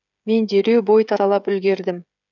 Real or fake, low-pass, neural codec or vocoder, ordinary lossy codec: fake; 7.2 kHz; codec, 16 kHz, 16 kbps, FreqCodec, smaller model; none